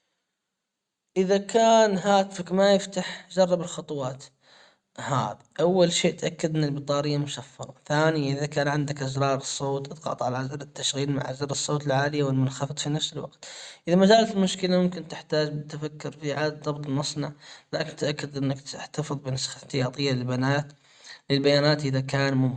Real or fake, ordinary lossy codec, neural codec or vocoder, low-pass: real; Opus, 64 kbps; none; 10.8 kHz